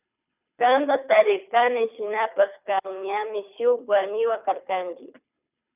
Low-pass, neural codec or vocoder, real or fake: 3.6 kHz; codec, 24 kHz, 3 kbps, HILCodec; fake